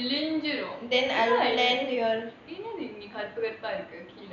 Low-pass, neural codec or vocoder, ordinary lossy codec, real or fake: 7.2 kHz; none; none; real